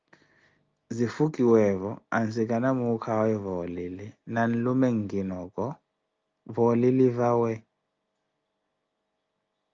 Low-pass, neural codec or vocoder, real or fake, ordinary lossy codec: 7.2 kHz; none; real; Opus, 24 kbps